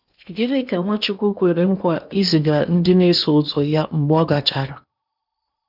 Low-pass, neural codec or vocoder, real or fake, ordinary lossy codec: 5.4 kHz; codec, 16 kHz in and 24 kHz out, 0.8 kbps, FocalCodec, streaming, 65536 codes; fake; none